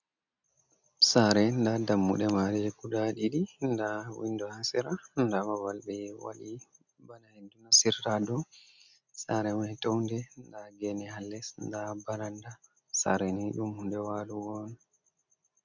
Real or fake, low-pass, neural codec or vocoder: real; 7.2 kHz; none